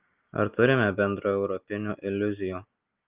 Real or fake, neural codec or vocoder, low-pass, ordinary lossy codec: real; none; 3.6 kHz; Opus, 32 kbps